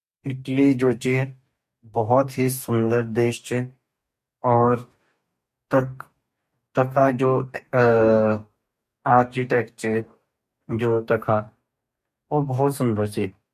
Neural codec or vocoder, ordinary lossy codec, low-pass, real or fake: codec, 44.1 kHz, 2.6 kbps, DAC; MP3, 64 kbps; 14.4 kHz; fake